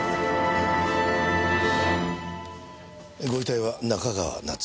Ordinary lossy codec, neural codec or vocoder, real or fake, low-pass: none; none; real; none